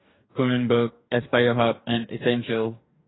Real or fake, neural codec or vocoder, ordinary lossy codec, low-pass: fake; codec, 44.1 kHz, 2.6 kbps, DAC; AAC, 16 kbps; 7.2 kHz